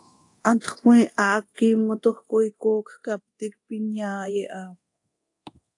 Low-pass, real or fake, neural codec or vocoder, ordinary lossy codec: 10.8 kHz; fake; codec, 24 kHz, 0.9 kbps, DualCodec; AAC, 48 kbps